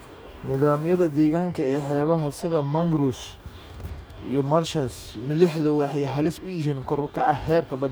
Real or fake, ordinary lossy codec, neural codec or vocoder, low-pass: fake; none; codec, 44.1 kHz, 2.6 kbps, DAC; none